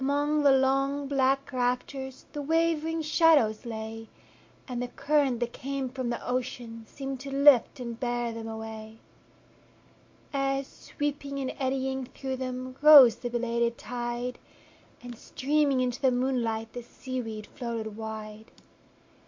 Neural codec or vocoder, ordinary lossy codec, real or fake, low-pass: none; MP3, 48 kbps; real; 7.2 kHz